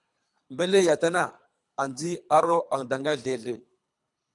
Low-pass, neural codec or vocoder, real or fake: 10.8 kHz; codec, 24 kHz, 3 kbps, HILCodec; fake